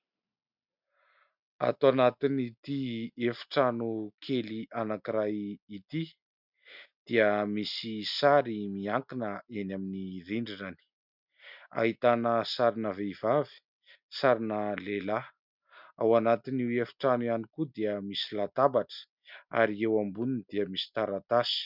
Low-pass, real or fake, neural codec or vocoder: 5.4 kHz; real; none